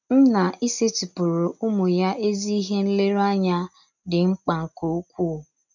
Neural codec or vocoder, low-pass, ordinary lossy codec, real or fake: none; 7.2 kHz; none; real